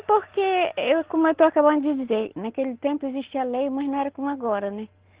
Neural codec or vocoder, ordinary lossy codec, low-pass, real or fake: none; Opus, 16 kbps; 3.6 kHz; real